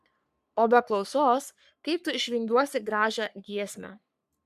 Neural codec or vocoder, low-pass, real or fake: codec, 44.1 kHz, 3.4 kbps, Pupu-Codec; 14.4 kHz; fake